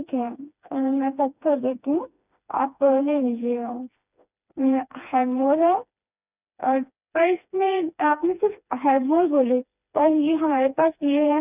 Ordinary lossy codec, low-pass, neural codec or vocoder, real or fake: none; 3.6 kHz; codec, 16 kHz, 2 kbps, FreqCodec, smaller model; fake